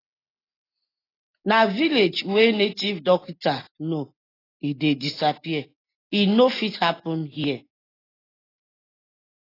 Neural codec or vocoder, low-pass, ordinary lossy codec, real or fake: none; 5.4 kHz; AAC, 24 kbps; real